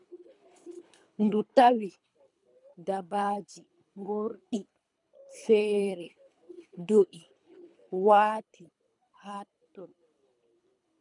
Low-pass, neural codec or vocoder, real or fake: 10.8 kHz; codec, 24 kHz, 3 kbps, HILCodec; fake